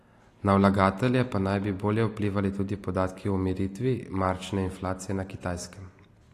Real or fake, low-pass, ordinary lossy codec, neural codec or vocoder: real; 14.4 kHz; AAC, 48 kbps; none